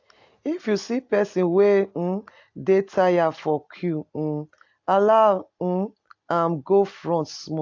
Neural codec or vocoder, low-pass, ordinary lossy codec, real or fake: none; 7.2 kHz; AAC, 48 kbps; real